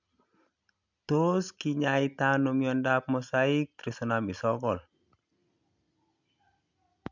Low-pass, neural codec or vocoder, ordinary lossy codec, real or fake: 7.2 kHz; none; MP3, 64 kbps; real